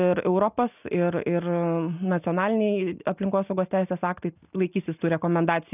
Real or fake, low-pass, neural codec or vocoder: real; 3.6 kHz; none